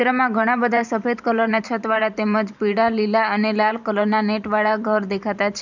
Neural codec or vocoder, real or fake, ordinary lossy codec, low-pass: vocoder, 44.1 kHz, 128 mel bands every 512 samples, BigVGAN v2; fake; none; 7.2 kHz